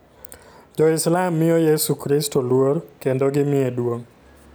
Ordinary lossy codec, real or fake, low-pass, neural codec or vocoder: none; real; none; none